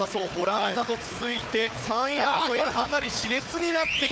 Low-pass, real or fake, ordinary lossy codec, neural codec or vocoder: none; fake; none; codec, 16 kHz, 4 kbps, FunCodec, trained on Chinese and English, 50 frames a second